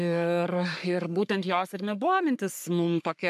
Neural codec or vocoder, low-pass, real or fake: codec, 44.1 kHz, 3.4 kbps, Pupu-Codec; 14.4 kHz; fake